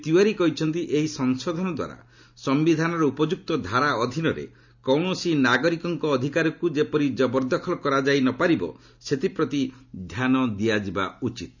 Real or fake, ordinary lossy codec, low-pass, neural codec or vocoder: real; none; 7.2 kHz; none